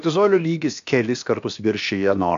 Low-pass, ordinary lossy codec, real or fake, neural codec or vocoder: 7.2 kHz; AAC, 64 kbps; fake; codec, 16 kHz, about 1 kbps, DyCAST, with the encoder's durations